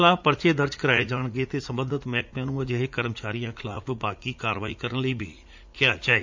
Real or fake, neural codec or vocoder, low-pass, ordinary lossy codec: fake; vocoder, 44.1 kHz, 80 mel bands, Vocos; 7.2 kHz; MP3, 64 kbps